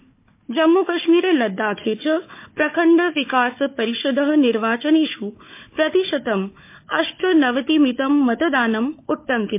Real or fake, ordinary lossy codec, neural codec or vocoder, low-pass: fake; MP3, 24 kbps; codec, 16 kHz, 4 kbps, FunCodec, trained on Chinese and English, 50 frames a second; 3.6 kHz